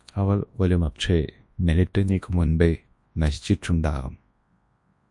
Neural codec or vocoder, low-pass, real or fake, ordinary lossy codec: codec, 24 kHz, 0.9 kbps, WavTokenizer, large speech release; 10.8 kHz; fake; MP3, 48 kbps